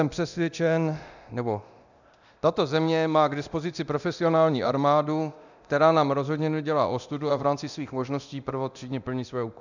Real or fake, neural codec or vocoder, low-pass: fake; codec, 24 kHz, 0.9 kbps, DualCodec; 7.2 kHz